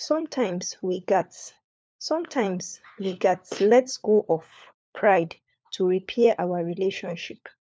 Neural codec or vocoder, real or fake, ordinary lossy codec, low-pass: codec, 16 kHz, 4 kbps, FunCodec, trained on LibriTTS, 50 frames a second; fake; none; none